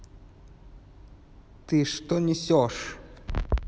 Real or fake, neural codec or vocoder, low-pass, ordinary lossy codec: real; none; none; none